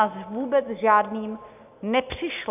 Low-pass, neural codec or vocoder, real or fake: 3.6 kHz; none; real